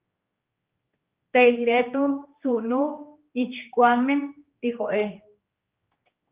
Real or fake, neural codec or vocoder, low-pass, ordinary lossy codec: fake; codec, 16 kHz, 2 kbps, X-Codec, HuBERT features, trained on general audio; 3.6 kHz; Opus, 32 kbps